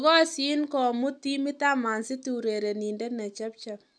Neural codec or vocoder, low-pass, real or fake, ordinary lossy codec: none; none; real; none